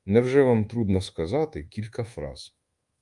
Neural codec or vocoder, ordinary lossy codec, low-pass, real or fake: codec, 24 kHz, 1.2 kbps, DualCodec; Opus, 32 kbps; 10.8 kHz; fake